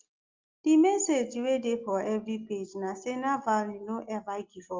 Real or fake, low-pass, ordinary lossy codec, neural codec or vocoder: real; 7.2 kHz; Opus, 32 kbps; none